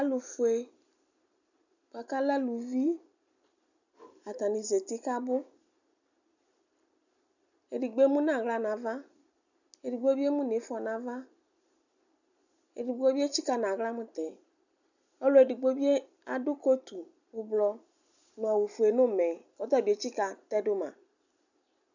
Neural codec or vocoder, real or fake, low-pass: none; real; 7.2 kHz